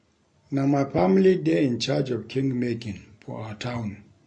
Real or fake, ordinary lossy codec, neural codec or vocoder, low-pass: real; MP3, 48 kbps; none; 9.9 kHz